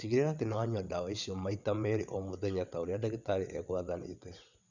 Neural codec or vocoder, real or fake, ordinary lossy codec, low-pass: vocoder, 22.05 kHz, 80 mel bands, WaveNeXt; fake; none; 7.2 kHz